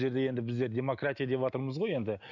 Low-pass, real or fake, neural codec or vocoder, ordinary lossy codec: 7.2 kHz; real; none; none